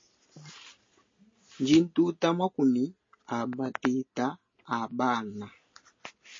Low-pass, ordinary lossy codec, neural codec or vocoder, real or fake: 7.2 kHz; MP3, 32 kbps; none; real